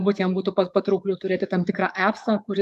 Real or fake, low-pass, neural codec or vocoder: fake; 14.4 kHz; codec, 44.1 kHz, 7.8 kbps, DAC